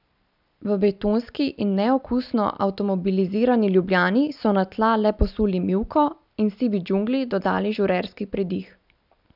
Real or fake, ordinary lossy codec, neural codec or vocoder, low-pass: real; none; none; 5.4 kHz